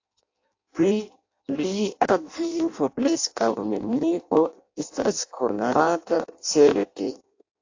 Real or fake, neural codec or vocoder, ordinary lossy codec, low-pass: fake; codec, 16 kHz in and 24 kHz out, 0.6 kbps, FireRedTTS-2 codec; AAC, 48 kbps; 7.2 kHz